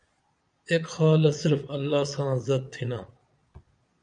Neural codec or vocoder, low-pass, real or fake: vocoder, 22.05 kHz, 80 mel bands, Vocos; 9.9 kHz; fake